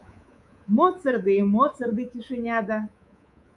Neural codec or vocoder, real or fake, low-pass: codec, 24 kHz, 3.1 kbps, DualCodec; fake; 10.8 kHz